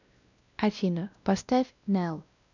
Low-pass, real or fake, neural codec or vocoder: 7.2 kHz; fake; codec, 16 kHz, 1 kbps, X-Codec, WavLM features, trained on Multilingual LibriSpeech